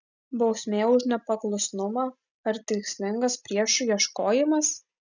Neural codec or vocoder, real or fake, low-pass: none; real; 7.2 kHz